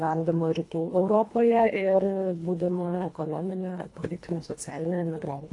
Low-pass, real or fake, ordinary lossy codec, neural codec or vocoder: 10.8 kHz; fake; AAC, 48 kbps; codec, 24 kHz, 1.5 kbps, HILCodec